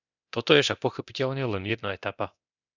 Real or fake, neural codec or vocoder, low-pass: fake; codec, 24 kHz, 0.9 kbps, DualCodec; 7.2 kHz